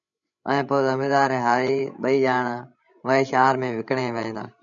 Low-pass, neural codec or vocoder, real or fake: 7.2 kHz; codec, 16 kHz, 16 kbps, FreqCodec, larger model; fake